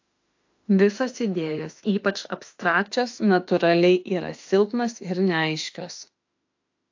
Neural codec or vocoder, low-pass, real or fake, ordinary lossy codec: autoencoder, 48 kHz, 32 numbers a frame, DAC-VAE, trained on Japanese speech; 7.2 kHz; fake; AAC, 48 kbps